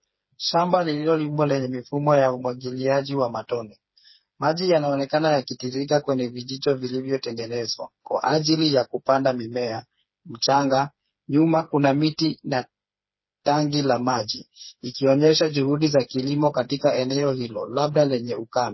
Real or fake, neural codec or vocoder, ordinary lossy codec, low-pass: fake; codec, 16 kHz, 4 kbps, FreqCodec, smaller model; MP3, 24 kbps; 7.2 kHz